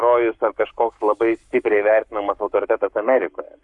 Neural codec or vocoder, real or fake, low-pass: codec, 44.1 kHz, 7.8 kbps, Pupu-Codec; fake; 10.8 kHz